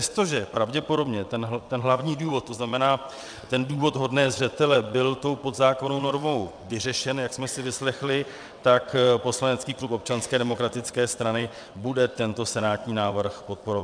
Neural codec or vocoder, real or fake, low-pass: vocoder, 22.05 kHz, 80 mel bands, WaveNeXt; fake; 9.9 kHz